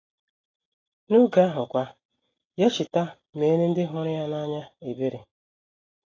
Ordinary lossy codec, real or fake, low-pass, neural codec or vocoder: AAC, 32 kbps; real; 7.2 kHz; none